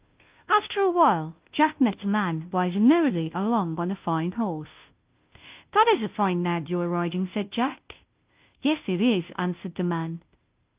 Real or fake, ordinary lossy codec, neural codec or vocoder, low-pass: fake; Opus, 32 kbps; codec, 16 kHz, 0.5 kbps, FunCodec, trained on Chinese and English, 25 frames a second; 3.6 kHz